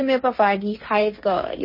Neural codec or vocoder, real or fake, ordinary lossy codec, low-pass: codec, 16 kHz, 1.1 kbps, Voila-Tokenizer; fake; MP3, 24 kbps; 5.4 kHz